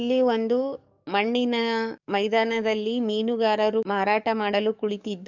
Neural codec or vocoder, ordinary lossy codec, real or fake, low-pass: codec, 44.1 kHz, 7.8 kbps, DAC; none; fake; 7.2 kHz